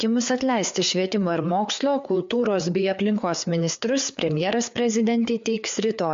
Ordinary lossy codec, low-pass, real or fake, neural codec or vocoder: MP3, 48 kbps; 7.2 kHz; fake; codec, 16 kHz, 4 kbps, FreqCodec, larger model